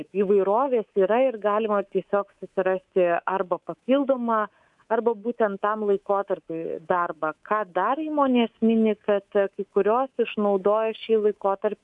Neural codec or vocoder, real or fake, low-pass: codec, 24 kHz, 3.1 kbps, DualCodec; fake; 10.8 kHz